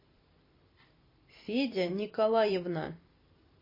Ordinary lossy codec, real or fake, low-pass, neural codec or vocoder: MP3, 24 kbps; fake; 5.4 kHz; vocoder, 44.1 kHz, 128 mel bands every 256 samples, BigVGAN v2